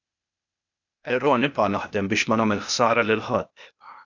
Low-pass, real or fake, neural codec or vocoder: 7.2 kHz; fake; codec, 16 kHz, 0.8 kbps, ZipCodec